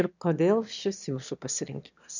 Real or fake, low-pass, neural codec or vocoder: fake; 7.2 kHz; autoencoder, 22.05 kHz, a latent of 192 numbers a frame, VITS, trained on one speaker